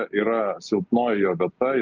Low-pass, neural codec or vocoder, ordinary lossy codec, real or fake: 7.2 kHz; none; Opus, 24 kbps; real